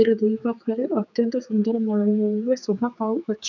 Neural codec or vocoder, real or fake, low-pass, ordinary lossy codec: codec, 16 kHz, 4 kbps, X-Codec, HuBERT features, trained on general audio; fake; 7.2 kHz; none